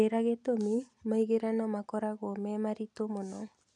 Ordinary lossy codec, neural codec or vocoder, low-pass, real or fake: none; none; 10.8 kHz; real